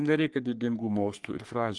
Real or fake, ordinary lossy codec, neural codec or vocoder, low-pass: fake; Opus, 24 kbps; codec, 44.1 kHz, 3.4 kbps, Pupu-Codec; 10.8 kHz